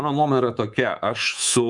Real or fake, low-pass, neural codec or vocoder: fake; 10.8 kHz; codec, 24 kHz, 3.1 kbps, DualCodec